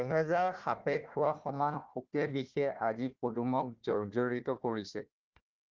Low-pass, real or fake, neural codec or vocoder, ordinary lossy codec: 7.2 kHz; fake; codec, 16 kHz, 1 kbps, FunCodec, trained on Chinese and English, 50 frames a second; Opus, 32 kbps